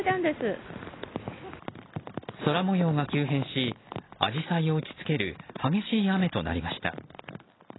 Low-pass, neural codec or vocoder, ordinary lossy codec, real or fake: 7.2 kHz; none; AAC, 16 kbps; real